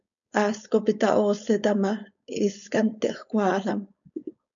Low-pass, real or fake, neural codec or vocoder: 7.2 kHz; fake; codec, 16 kHz, 4.8 kbps, FACodec